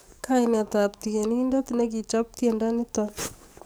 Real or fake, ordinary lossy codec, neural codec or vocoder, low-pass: fake; none; codec, 44.1 kHz, 7.8 kbps, DAC; none